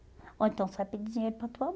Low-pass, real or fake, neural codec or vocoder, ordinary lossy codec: none; real; none; none